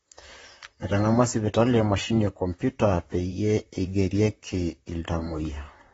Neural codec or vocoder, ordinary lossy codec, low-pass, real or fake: vocoder, 44.1 kHz, 128 mel bands, Pupu-Vocoder; AAC, 24 kbps; 19.8 kHz; fake